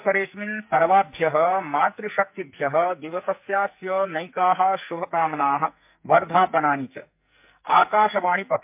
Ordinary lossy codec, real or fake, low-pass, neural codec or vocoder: MP3, 32 kbps; fake; 3.6 kHz; codec, 44.1 kHz, 2.6 kbps, SNAC